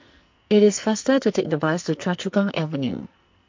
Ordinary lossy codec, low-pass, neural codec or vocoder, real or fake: MP3, 48 kbps; 7.2 kHz; codec, 44.1 kHz, 2.6 kbps, SNAC; fake